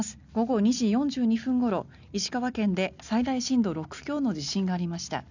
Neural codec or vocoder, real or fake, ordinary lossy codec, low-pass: none; real; none; 7.2 kHz